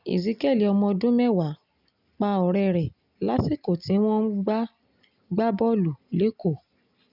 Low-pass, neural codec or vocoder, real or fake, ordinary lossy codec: 5.4 kHz; none; real; none